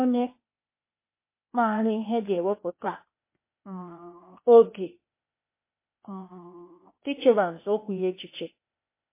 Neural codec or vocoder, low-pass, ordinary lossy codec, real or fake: codec, 16 kHz, 0.8 kbps, ZipCodec; 3.6 kHz; MP3, 24 kbps; fake